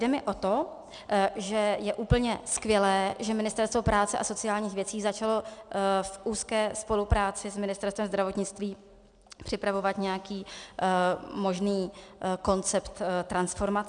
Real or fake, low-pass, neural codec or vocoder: real; 9.9 kHz; none